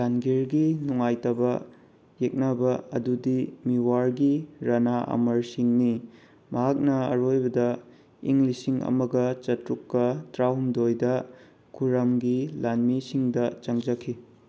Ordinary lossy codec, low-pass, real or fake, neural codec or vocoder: none; none; real; none